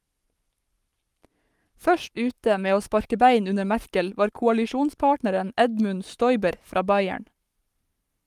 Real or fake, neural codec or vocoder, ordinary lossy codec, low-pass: fake; codec, 44.1 kHz, 7.8 kbps, Pupu-Codec; Opus, 32 kbps; 14.4 kHz